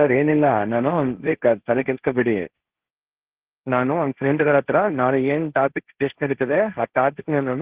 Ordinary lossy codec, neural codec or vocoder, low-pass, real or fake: Opus, 16 kbps; codec, 16 kHz, 1.1 kbps, Voila-Tokenizer; 3.6 kHz; fake